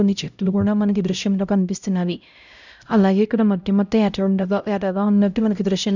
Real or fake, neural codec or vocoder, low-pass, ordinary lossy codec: fake; codec, 16 kHz, 0.5 kbps, X-Codec, HuBERT features, trained on LibriSpeech; 7.2 kHz; none